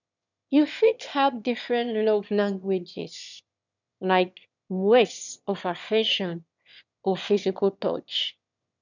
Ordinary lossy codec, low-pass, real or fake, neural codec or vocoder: none; 7.2 kHz; fake; autoencoder, 22.05 kHz, a latent of 192 numbers a frame, VITS, trained on one speaker